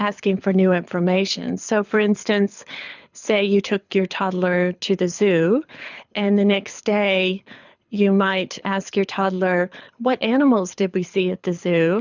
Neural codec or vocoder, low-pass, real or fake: codec, 24 kHz, 6 kbps, HILCodec; 7.2 kHz; fake